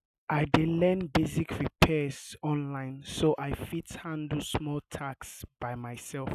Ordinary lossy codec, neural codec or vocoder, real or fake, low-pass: none; none; real; 14.4 kHz